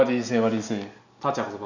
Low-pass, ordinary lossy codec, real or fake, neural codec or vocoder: 7.2 kHz; none; real; none